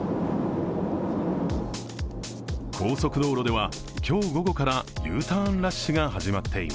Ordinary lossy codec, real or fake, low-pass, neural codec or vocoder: none; real; none; none